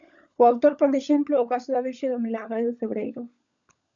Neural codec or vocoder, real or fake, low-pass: codec, 16 kHz, 16 kbps, FunCodec, trained on LibriTTS, 50 frames a second; fake; 7.2 kHz